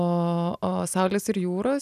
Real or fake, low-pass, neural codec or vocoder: real; 14.4 kHz; none